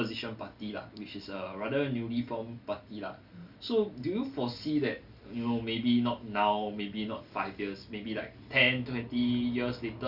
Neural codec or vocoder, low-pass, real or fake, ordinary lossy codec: none; 5.4 kHz; real; Opus, 64 kbps